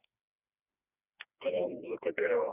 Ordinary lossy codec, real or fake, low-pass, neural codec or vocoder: none; fake; 3.6 kHz; codec, 16 kHz, 2 kbps, FreqCodec, smaller model